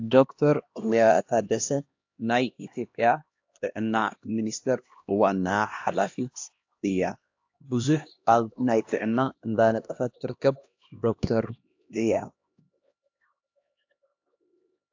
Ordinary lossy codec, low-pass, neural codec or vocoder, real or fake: AAC, 48 kbps; 7.2 kHz; codec, 16 kHz, 1 kbps, X-Codec, HuBERT features, trained on LibriSpeech; fake